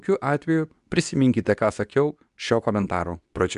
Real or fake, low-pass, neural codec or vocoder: fake; 10.8 kHz; codec, 24 kHz, 0.9 kbps, WavTokenizer, medium speech release version 2